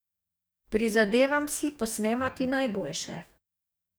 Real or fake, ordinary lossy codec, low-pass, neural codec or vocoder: fake; none; none; codec, 44.1 kHz, 2.6 kbps, DAC